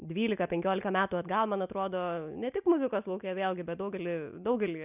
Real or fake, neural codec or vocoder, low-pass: real; none; 3.6 kHz